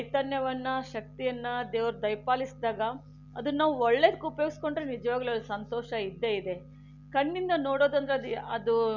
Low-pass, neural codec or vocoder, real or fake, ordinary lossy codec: 7.2 kHz; none; real; none